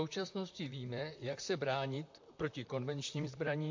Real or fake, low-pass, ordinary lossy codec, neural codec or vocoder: fake; 7.2 kHz; MP3, 48 kbps; vocoder, 44.1 kHz, 128 mel bands, Pupu-Vocoder